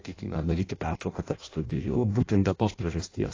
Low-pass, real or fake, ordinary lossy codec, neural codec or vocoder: 7.2 kHz; fake; AAC, 32 kbps; codec, 16 kHz in and 24 kHz out, 0.6 kbps, FireRedTTS-2 codec